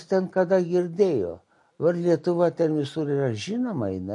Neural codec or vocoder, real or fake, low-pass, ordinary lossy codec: none; real; 10.8 kHz; MP3, 48 kbps